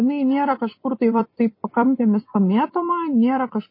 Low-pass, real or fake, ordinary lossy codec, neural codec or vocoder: 5.4 kHz; fake; MP3, 24 kbps; vocoder, 44.1 kHz, 128 mel bands every 256 samples, BigVGAN v2